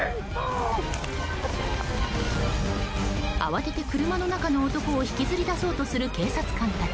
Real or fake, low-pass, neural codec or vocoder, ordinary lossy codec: real; none; none; none